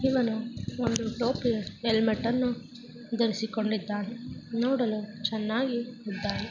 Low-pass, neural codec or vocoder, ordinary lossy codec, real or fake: 7.2 kHz; none; none; real